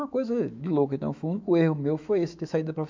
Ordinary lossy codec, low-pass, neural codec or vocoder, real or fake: MP3, 64 kbps; 7.2 kHz; autoencoder, 48 kHz, 128 numbers a frame, DAC-VAE, trained on Japanese speech; fake